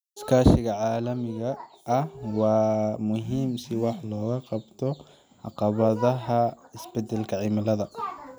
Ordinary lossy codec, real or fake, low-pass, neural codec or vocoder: none; real; none; none